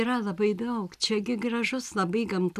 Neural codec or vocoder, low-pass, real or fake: none; 14.4 kHz; real